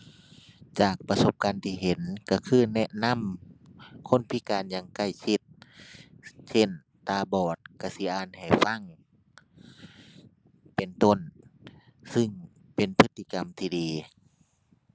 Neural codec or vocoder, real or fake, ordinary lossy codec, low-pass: none; real; none; none